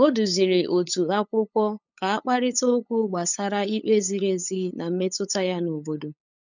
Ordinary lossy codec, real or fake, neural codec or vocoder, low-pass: none; fake; codec, 16 kHz, 8 kbps, FunCodec, trained on LibriTTS, 25 frames a second; 7.2 kHz